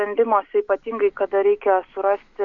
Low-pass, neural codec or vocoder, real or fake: 7.2 kHz; none; real